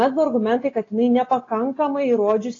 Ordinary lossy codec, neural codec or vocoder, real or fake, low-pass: AAC, 32 kbps; none; real; 7.2 kHz